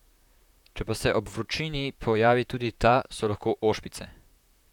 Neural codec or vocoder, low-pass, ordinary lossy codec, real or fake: vocoder, 44.1 kHz, 128 mel bands, Pupu-Vocoder; 19.8 kHz; none; fake